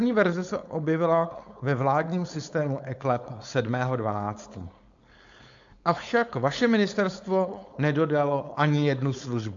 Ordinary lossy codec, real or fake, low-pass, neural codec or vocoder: AAC, 64 kbps; fake; 7.2 kHz; codec, 16 kHz, 4.8 kbps, FACodec